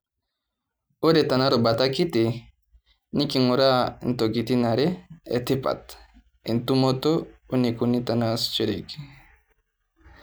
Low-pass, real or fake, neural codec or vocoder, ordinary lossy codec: none; real; none; none